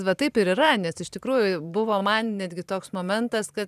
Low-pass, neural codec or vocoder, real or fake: 14.4 kHz; vocoder, 44.1 kHz, 128 mel bands every 512 samples, BigVGAN v2; fake